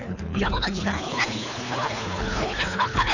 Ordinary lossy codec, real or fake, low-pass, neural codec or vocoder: none; fake; 7.2 kHz; codec, 24 kHz, 3 kbps, HILCodec